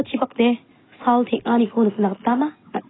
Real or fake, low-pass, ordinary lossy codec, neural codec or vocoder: real; 7.2 kHz; AAC, 16 kbps; none